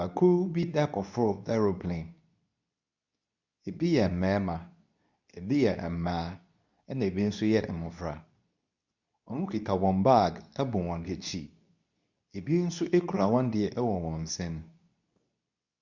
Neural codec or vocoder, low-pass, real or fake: codec, 24 kHz, 0.9 kbps, WavTokenizer, medium speech release version 2; 7.2 kHz; fake